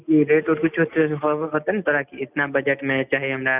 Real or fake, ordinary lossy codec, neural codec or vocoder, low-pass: real; none; none; 3.6 kHz